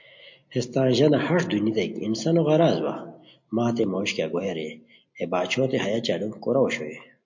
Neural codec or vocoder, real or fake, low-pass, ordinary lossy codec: none; real; 7.2 kHz; MP3, 48 kbps